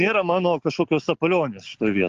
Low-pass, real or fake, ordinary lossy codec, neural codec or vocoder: 7.2 kHz; real; Opus, 32 kbps; none